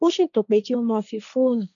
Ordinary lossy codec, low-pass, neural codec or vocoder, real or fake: none; 7.2 kHz; codec, 16 kHz, 1.1 kbps, Voila-Tokenizer; fake